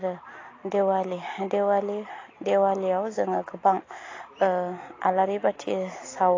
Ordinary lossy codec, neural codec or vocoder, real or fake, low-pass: AAC, 32 kbps; none; real; 7.2 kHz